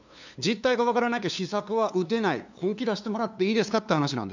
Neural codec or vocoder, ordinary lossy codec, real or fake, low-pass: codec, 16 kHz, 2 kbps, FunCodec, trained on LibriTTS, 25 frames a second; none; fake; 7.2 kHz